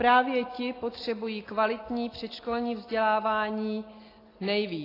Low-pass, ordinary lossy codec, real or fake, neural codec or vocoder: 5.4 kHz; AAC, 24 kbps; real; none